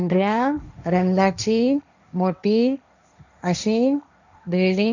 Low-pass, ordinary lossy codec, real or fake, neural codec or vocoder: 7.2 kHz; none; fake; codec, 16 kHz, 1.1 kbps, Voila-Tokenizer